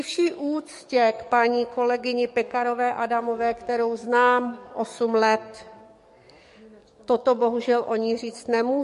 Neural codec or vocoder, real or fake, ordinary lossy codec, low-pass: codec, 44.1 kHz, 7.8 kbps, DAC; fake; MP3, 48 kbps; 14.4 kHz